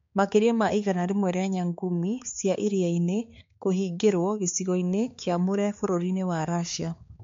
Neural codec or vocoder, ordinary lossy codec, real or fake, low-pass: codec, 16 kHz, 4 kbps, X-Codec, HuBERT features, trained on balanced general audio; MP3, 48 kbps; fake; 7.2 kHz